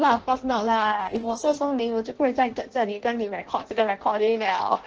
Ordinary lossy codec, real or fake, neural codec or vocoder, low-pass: Opus, 16 kbps; fake; codec, 16 kHz in and 24 kHz out, 0.6 kbps, FireRedTTS-2 codec; 7.2 kHz